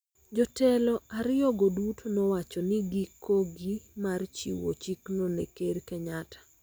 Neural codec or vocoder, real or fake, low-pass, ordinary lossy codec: none; real; none; none